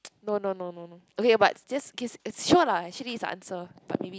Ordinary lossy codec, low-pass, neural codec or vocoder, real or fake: none; none; none; real